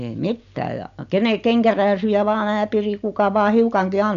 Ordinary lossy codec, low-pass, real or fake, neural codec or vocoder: none; 7.2 kHz; real; none